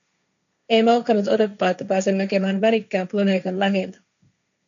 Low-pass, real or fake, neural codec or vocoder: 7.2 kHz; fake; codec, 16 kHz, 1.1 kbps, Voila-Tokenizer